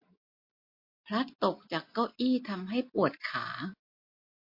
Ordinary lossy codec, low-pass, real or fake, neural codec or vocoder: MP3, 32 kbps; 5.4 kHz; real; none